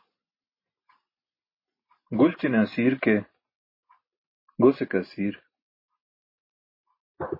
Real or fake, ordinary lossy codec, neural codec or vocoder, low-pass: real; MP3, 24 kbps; none; 5.4 kHz